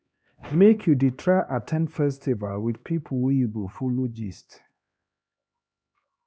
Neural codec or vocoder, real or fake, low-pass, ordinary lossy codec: codec, 16 kHz, 2 kbps, X-Codec, HuBERT features, trained on LibriSpeech; fake; none; none